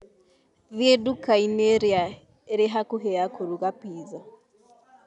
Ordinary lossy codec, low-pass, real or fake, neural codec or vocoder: none; 10.8 kHz; real; none